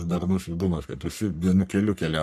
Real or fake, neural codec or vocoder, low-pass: fake; codec, 44.1 kHz, 3.4 kbps, Pupu-Codec; 14.4 kHz